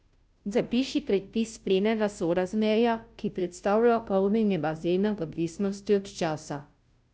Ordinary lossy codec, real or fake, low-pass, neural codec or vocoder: none; fake; none; codec, 16 kHz, 0.5 kbps, FunCodec, trained on Chinese and English, 25 frames a second